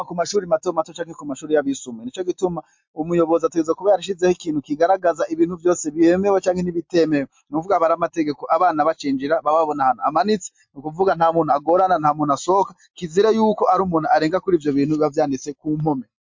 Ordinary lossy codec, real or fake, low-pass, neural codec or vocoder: MP3, 48 kbps; real; 7.2 kHz; none